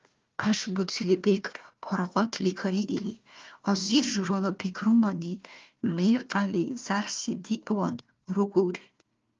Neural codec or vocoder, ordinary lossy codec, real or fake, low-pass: codec, 16 kHz, 1 kbps, FunCodec, trained on Chinese and English, 50 frames a second; Opus, 32 kbps; fake; 7.2 kHz